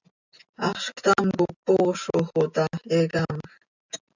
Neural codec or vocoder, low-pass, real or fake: none; 7.2 kHz; real